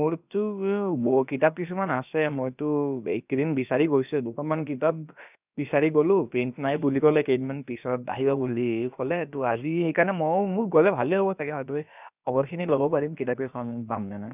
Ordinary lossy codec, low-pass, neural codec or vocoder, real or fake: none; 3.6 kHz; codec, 16 kHz, about 1 kbps, DyCAST, with the encoder's durations; fake